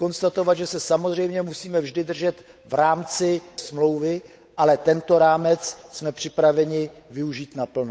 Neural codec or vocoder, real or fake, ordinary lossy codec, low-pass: codec, 16 kHz, 8 kbps, FunCodec, trained on Chinese and English, 25 frames a second; fake; none; none